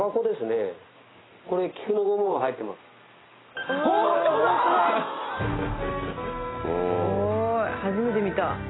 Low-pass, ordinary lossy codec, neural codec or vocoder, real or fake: 7.2 kHz; AAC, 16 kbps; none; real